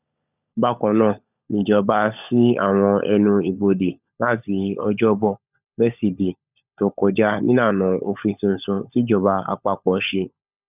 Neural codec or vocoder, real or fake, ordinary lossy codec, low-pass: codec, 16 kHz, 16 kbps, FunCodec, trained on LibriTTS, 50 frames a second; fake; none; 3.6 kHz